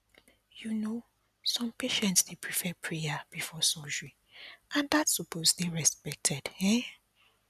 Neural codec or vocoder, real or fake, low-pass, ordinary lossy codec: none; real; 14.4 kHz; none